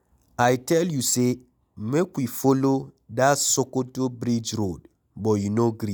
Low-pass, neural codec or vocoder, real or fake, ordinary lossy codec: none; none; real; none